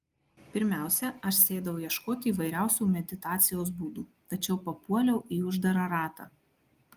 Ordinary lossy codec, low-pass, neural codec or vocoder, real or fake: Opus, 32 kbps; 14.4 kHz; none; real